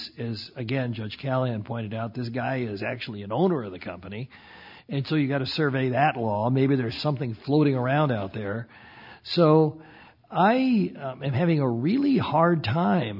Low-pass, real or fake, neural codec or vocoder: 5.4 kHz; real; none